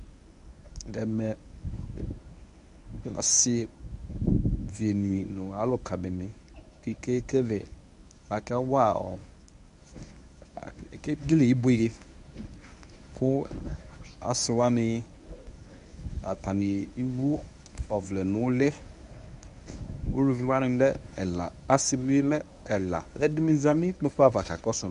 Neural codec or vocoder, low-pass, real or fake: codec, 24 kHz, 0.9 kbps, WavTokenizer, medium speech release version 1; 10.8 kHz; fake